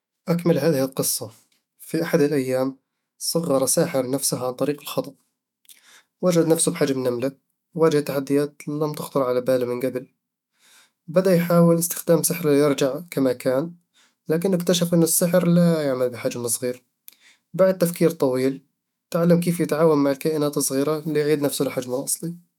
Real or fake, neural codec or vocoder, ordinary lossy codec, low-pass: fake; autoencoder, 48 kHz, 128 numbers a frame, DAC-VAE, trained on Japanese speech; none; 19.8 kHz